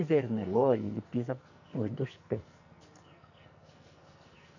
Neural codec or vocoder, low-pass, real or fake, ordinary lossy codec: codec, 44.1 kHz, 2.6 kbps, SNAC; 7.2 kHz; fake; none